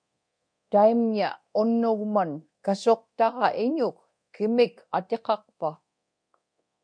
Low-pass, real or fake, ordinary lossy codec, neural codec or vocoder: 9.9 kHz; fake; MP3, 64 kbps; codec, 24 kHz, 0.9 kbps, DualCodec